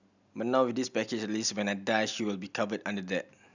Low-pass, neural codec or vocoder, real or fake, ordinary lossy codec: 7.2 kHz; none; real; none